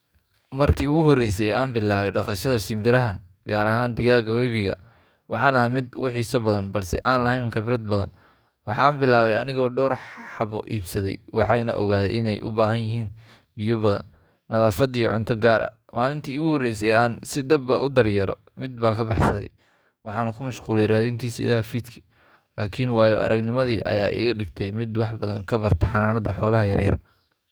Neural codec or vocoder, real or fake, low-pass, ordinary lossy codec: codec, 44.1 kHz, 2.6 kbps, DAC; fake; none; none